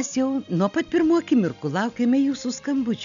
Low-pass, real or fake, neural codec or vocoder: 7.2 kHz; real; none